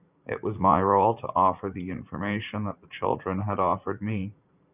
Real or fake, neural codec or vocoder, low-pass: fake; vocoder, 44.1 kHz, 80 mel bands, Vocos; 3.6 kHz